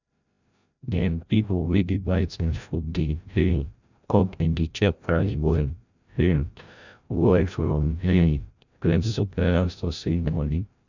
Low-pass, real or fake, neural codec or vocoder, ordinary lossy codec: 7.2 kHz; fake; codec, 16 kHz, 0.5 kbps, FreqCodec, larger model; none